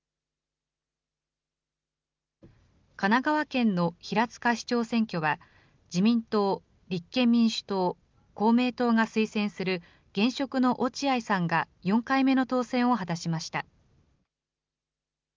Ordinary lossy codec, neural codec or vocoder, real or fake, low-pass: Opus, 24 kbps; none; real; 7.2 kHz